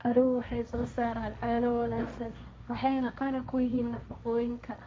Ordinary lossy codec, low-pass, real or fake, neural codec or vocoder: none; none; fake; codec, 16 kHz, 1.1 kbps, Voila-Tokenizer